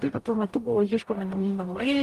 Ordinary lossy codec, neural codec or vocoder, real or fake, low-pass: Opus, 32 kbps; codec, 44.1 kHz, 0.9 kbps, DAC; fake; 14.4 kHz